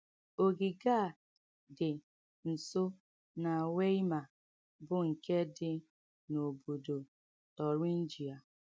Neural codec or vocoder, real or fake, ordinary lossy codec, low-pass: none; real; none; none